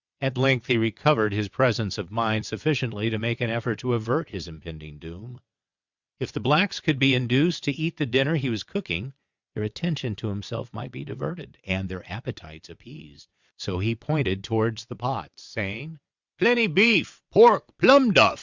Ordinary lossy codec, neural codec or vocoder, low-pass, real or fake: Opus, 64 kbps; vocoder, 22.05 kHz, 80 mel bands, WaveNeXt; 7.2 kHz; fake